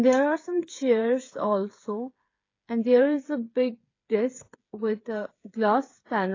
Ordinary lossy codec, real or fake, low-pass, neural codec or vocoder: AAC, 32 kbps; fake; 7.2 kHz; codec, 16 kHz, 8 kbps, FreqCodec, smaller model